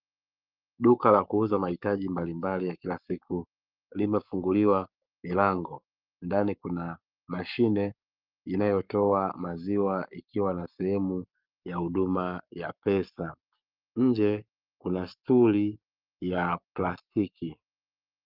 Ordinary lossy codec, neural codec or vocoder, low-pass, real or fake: Opus, 32 kbps; codec, 44.1 kHz, 7.8 kbps, Pupu-Codec; 5.4 kHz; fake